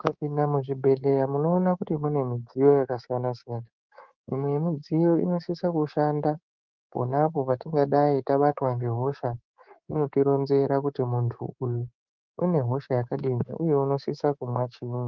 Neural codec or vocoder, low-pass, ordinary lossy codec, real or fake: codec, 24 kHz, 3.1 kbps, DualCodec; 7.2 kHz; Opus, 32 kbps; fake